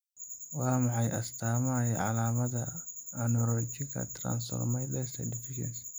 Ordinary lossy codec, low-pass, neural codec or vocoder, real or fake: none; none; none; real